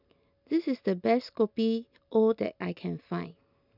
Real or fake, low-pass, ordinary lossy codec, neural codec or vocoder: real; 5.4 kHz; none; none